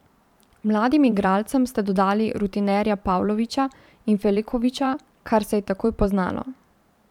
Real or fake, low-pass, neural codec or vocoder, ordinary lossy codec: fake; 19.8 kHz; vocoder, 44.1 kHz, 128 mel bands every 512 samples, BigVGAN v2; none